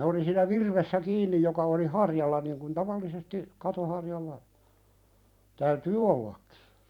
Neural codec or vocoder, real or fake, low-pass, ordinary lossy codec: vocoder, 44.1 kHz, 128 mel bands every 512 samples, BigVGAN v2; fake; 19.8 kHz; none